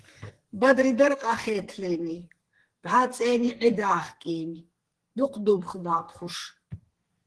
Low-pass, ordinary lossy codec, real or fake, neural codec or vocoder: 10.8 kHz; Opus, 16 kbps; fake; codec, 32 kHz, 1.9 kbps, SNAC